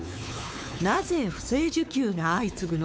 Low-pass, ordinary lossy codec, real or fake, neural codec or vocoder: none; none; fake; codec, 16 kHz, 4 kbps, X-Codec, WavLM features, trained on Multilingual LibriSpeech